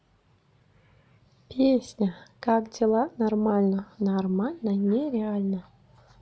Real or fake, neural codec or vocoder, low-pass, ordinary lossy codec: real; none; none; none